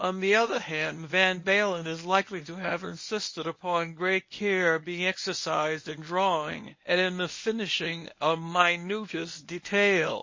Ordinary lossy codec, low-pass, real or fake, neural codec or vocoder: MP3, 32 kbps; 7.2 kHz; fake; codec, 24 kHz, 0.9 kbps, WavTokenizer, medium speech release version 1